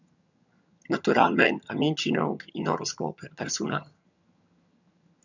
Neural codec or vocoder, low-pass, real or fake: vocoder, 22.05 kHz, 80 mel bands, HiFi-GAN; 7.2 kHz; fake